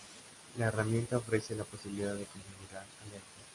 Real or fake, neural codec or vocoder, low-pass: real; none; 10.8 kHz